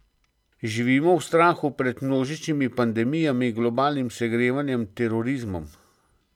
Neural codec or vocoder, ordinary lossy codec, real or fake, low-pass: none; none; real; 19.8 kHz